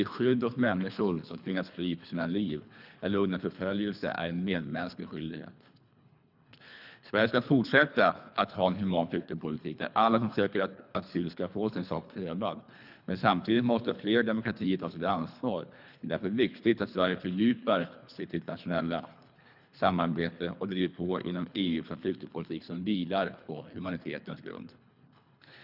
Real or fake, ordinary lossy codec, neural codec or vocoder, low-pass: fake; none; codec, 24 kHz, 3 kbps, HILCodec; 5.4 kHz